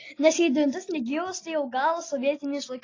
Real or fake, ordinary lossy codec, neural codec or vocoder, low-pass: real; AAC, 32 kbps; none; 7.2 kHz